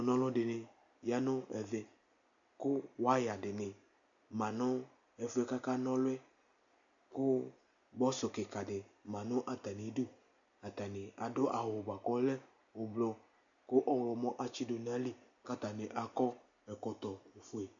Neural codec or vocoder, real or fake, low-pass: none; real; 7.2 kHz